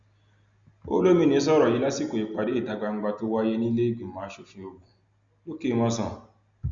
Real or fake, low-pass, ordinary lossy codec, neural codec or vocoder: real; 7.2 kHz; Opus, 64 kbps; none